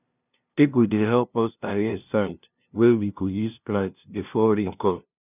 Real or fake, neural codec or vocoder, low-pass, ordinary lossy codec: fake; codec, 16 kHz, 0.5 kbps, FunCodec, trained on LibriTTS, 25 frames a second; 3.6 kHz; none